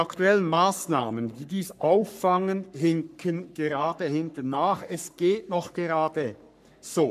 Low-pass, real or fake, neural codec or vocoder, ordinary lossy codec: 14.4 kHz; fake; codec, 44.1 kHz, 3.4 kbps, Pupu-Codec; none